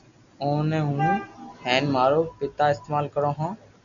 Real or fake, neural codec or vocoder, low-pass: real; none; 7.2 kHz